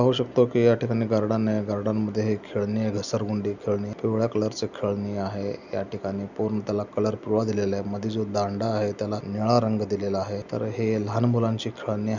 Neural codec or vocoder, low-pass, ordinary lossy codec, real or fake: none; 7.2 kHz; none; real